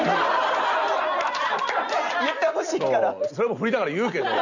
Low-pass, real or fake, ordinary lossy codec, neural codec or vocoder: 7.2 kHz; real; none; none